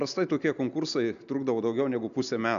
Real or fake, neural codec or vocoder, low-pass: real; none; 7.2 kHz